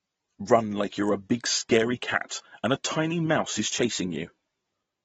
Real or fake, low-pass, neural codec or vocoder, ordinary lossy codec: real; 19.8 kHz; none; AAC, 24 kbps